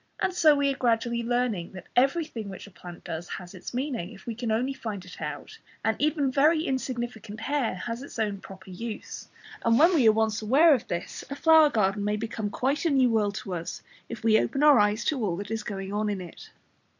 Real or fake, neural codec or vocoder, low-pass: fake; vocoder, 44.1 kHz, 128 mel bands every 256 samples, BigVGAN v2; 7.2 kHz